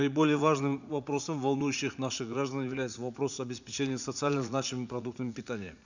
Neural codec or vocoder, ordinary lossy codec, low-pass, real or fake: vocoder, 44.1 kHz, 80 mel bands, Vocos; none; 7.2 kHz; fake